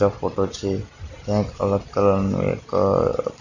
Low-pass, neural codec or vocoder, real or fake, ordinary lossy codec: 7.2 kHz; none; real; none